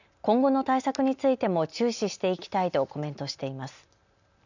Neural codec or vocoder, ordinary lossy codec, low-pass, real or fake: none; none; 7.2 kHz; real